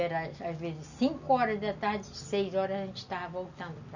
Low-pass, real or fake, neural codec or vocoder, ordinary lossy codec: 7.2 kHz; real; none; none